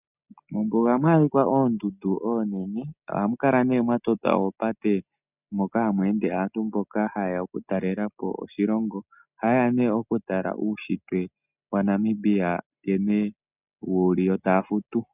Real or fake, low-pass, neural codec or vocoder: real; 3.6 kHz; none